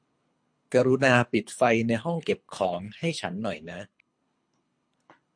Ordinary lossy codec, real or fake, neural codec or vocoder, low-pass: MP3, 48 kbps; fake; codec, 24 kHz, 3 kbps, HILCodec; 9.9 kHz